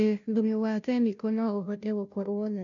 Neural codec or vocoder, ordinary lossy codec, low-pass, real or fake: codec, 16 kHz, 0.5 kbps, FunCodec, trained on Chinese and English, 25 frames a second; none; 7.2 kHz; fake